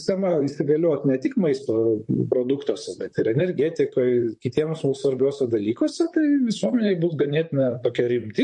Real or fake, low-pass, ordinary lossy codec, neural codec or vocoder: fake; 10.8 kHz; MP3, 48 kbps; vocoder, 44.1 kHz, 128 mel bands, Pupu-Vocoder